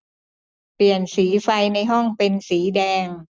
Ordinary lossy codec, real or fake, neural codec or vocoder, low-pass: none; real; none; none